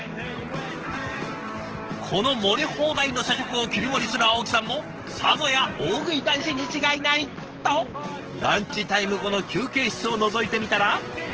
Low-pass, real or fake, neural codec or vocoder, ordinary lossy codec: 7.2 kHz; fake; codec, 44.1 kHz, 7.8 kbps, DAC; Opus, 16 kbps